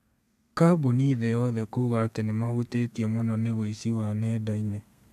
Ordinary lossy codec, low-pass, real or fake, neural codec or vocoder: none; 14.4 kHz; fake; codec, 32 kHz, 1.9 kbps, SNAC